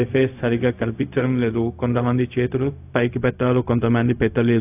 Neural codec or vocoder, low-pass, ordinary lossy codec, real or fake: codec, 16 kHz, 0.4 kbps, LongCat-Audio-Codec; 3.6 kHz; AAC, 32 kbps; fake